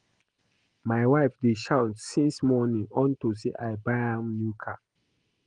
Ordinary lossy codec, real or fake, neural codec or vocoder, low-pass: Opus, 24 kbps; real; none; 9.9 kHz